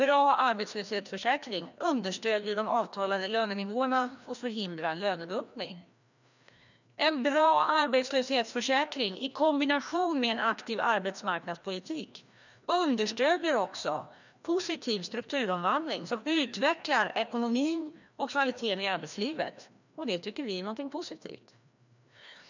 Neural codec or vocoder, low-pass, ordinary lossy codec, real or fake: codec, 16 kHz, 1 kbps, FreqCodec, larger model; 7.2 kHz; none; fake